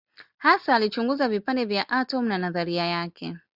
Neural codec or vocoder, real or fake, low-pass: none; real; 5.4 kHz